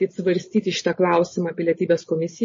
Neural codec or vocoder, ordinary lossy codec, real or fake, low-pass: none; MP3, 32 kbps; real; 7.2 kHz